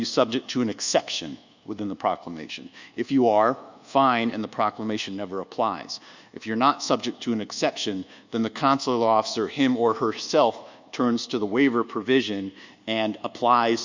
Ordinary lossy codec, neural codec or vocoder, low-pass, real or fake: Opus, 64 kbps; codec, 24 kHz, 1.2 kbps, DualCodec; 7.2 kHz; fake